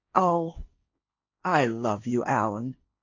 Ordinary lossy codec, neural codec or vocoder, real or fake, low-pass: AAC, 48 kbps; codec, 16 kHz, 1.1 kbps, Voila-Tokenizer; fake; 7.2 kHz